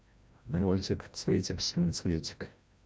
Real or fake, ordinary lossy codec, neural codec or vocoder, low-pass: fake; none; codec, 16 kHz, 0.5 kbps, FreqCodec, larger model; none